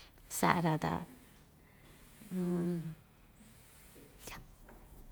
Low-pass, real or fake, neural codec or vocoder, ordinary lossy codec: none; fake; vocoder, 48 kHz, 128 mel bands, Vocos; none